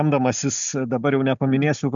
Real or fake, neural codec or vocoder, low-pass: real; none; 7.2 kHz